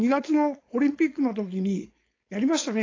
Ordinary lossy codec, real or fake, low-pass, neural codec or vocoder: AAC, 32 kbps; fake; 7.2 kHz; codec, 16 kHz, 4.8 kbps, FACodec